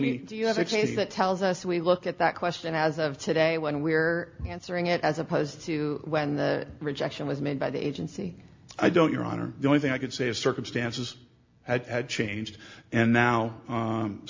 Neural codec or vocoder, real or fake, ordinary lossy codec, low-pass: none; real; MP3, 48 kbps; 7.2 kHz